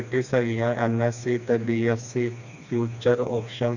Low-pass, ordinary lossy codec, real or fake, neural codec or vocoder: 7.2 kHz; none; fake; codec, 16 kHz, 2 kbps, FreqCodec, smaller model